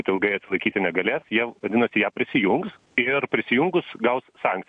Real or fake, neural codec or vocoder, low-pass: real; none; 9.9 kHz